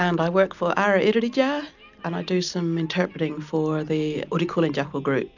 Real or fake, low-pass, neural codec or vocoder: real; 7.2 kHz; none